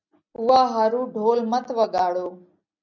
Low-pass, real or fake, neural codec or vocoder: 7.2 kHz; real; none